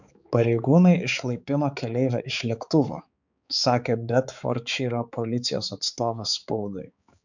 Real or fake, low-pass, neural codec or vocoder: fake; 7.2 kHz; codec, 16 kHz, 4 kbps, X-Codec, HuBERT features, trained on balanced general audio